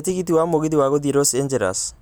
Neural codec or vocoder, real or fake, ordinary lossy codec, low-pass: none; real; none; none